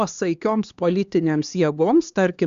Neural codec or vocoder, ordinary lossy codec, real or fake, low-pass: codec, 16 kHz, 2 kbps, X-Codec, HuBERT features, trained on LibriSpeech; Opus, 64 kbps; fake; 7.2 kHz